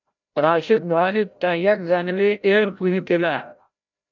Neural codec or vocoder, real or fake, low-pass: codec, 16 kHz, 0.5 kbps, FreqCodec, larger model; fake; 7.2 kHz